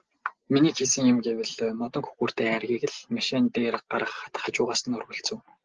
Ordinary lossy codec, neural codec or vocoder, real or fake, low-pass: Opus, 16 kbps; none; real; 7.2 kHz